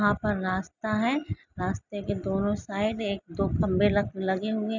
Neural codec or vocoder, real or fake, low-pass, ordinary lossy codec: none; real; 7.2 kHz; none